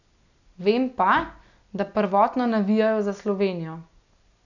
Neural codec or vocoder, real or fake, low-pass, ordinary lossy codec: none; real; 7.2 kHz; none